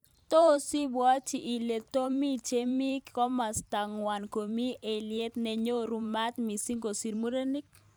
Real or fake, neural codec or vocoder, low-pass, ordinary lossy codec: fake; vocoder, 44.1 kHz, 128 mel bands every 512 samples, BigVGAN v2; none; none